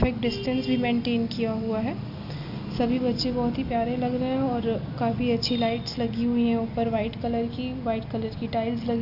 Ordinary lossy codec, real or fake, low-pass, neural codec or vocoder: none; real; 5.4 kHz; none